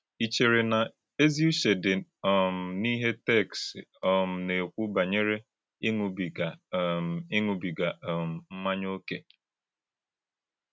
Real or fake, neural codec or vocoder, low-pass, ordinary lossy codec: real; none; none; none